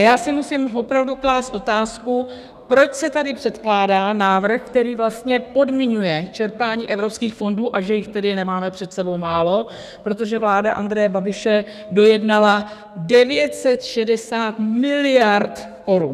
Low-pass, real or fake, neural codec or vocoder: 14.4 kHz; fake; codec, 32 kHz, 1.9 kbps, SNAC